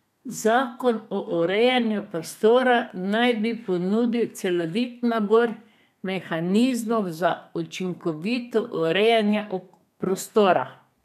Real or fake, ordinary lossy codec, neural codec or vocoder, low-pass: fake; none; codec, 32 kHz, 1.9 kbps, SNAC; 14.4 kHz